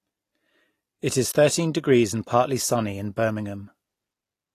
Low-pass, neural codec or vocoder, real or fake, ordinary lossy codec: 14.4 kHz; none; real; AAC, 48 kbps